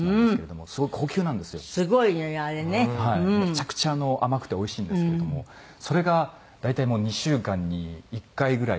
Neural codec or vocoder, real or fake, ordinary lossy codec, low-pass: none; real; none; none